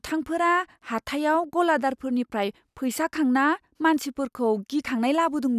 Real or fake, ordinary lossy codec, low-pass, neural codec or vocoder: real; none; 14.4 kHz; none